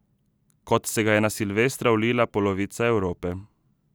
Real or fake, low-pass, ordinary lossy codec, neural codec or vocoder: real; none; none; none